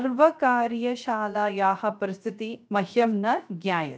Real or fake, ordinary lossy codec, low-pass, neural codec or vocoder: fake; none; none; codec, 16 kHz, 0.7 kbps, FocalCodec